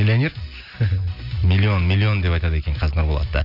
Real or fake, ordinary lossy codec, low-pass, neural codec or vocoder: real; none; 5.4 kHz; none